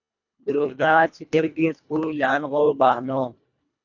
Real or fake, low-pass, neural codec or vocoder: fake; 7.2 kHz; codec, 24 kHz, 1.5 kbps, HILCodec